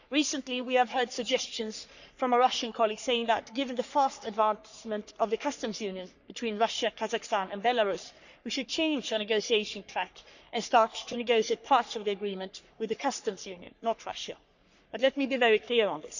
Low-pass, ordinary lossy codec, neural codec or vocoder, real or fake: 7.2 kHz; none; codec, 44.1 kHz, 3.4 kbps, Pupu-Codec; fake